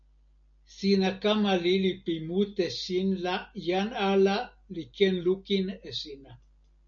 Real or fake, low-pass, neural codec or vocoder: real; 7.2 kHz; none